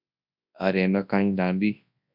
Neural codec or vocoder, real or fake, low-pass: codec, 24 kHz, 0.9 kbps, WavTokenizer, large speech release; fake; 5.4 kHz